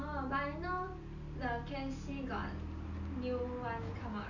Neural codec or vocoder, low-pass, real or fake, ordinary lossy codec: none; 7.2 kHz; real; none